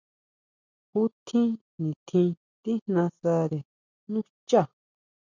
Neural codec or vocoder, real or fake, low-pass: none; real; 7.2 kHz